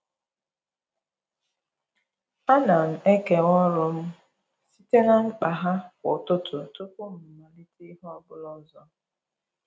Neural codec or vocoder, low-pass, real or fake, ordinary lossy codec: none; none; real; none